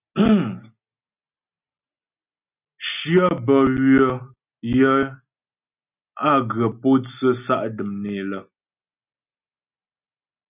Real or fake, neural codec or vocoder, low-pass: real; none; 3.6 kHz